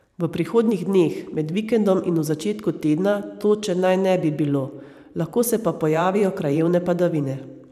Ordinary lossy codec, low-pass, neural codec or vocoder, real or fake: none; 14.4 kHz; vocoder, 44.1 kHz, 128 mel bands every 512 samples, BigVGAN v2; fake